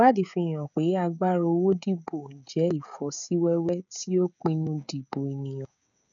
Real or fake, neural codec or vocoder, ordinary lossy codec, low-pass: fake; codec, 16 kHz, 16 kbps, FreqCodec, smaller model; none; 7.2 kHz